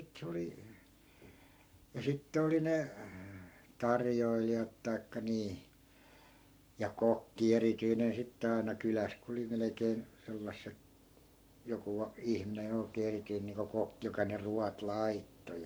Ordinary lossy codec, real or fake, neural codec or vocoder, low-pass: none; fake; codec, 44.1 kHz, 7.8 kbps, Pupu-Codec; none